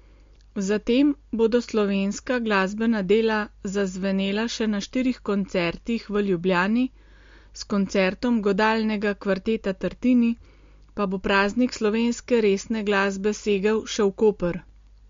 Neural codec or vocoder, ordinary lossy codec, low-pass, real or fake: none; MP3, 48 kbps; 7.2 kHz; real